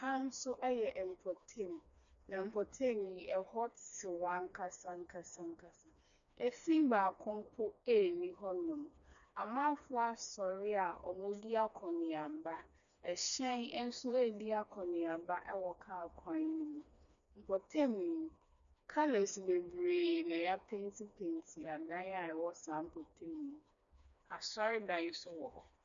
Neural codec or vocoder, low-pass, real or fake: codec, 16 kHz, 2 kbps, FreqCodec, smaller model; 7.2 kHz; fake